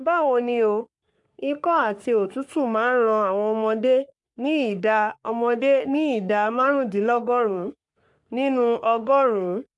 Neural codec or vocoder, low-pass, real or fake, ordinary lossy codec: codec, 44.1 kHz, 3.4 kbps, Pupu-Codec; 10.8 kHz; fake; MP3, 96 kbps